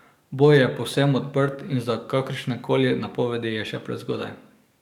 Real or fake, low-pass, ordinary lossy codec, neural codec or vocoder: fake; 19.8 kHz; none; codec, 44.1 kHz, 7.8 kbps, DAC